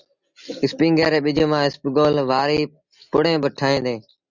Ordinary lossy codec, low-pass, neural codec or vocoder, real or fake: Opus, 64 kbps; 7.2 kHz; none; real